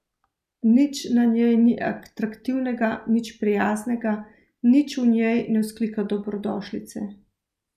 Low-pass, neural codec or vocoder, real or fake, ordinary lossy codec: 14.4 kHz; none; real; none